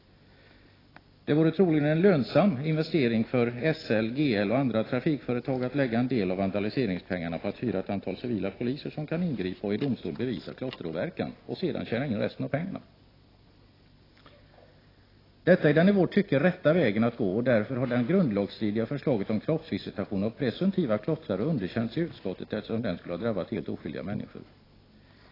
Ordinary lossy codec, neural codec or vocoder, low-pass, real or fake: AAC, 24 kbps; none; 5.4 kHz; real